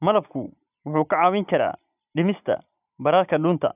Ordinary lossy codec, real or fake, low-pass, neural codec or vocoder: none; real; 3.6 kHz; none